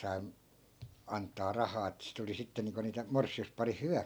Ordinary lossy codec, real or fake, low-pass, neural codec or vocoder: none; real; none; none